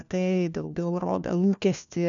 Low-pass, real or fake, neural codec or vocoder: 7.2 kHz; fake; codec, 16 kHz, 1 kbps, FunCodec, trained on LibriTTS, 50 frames a second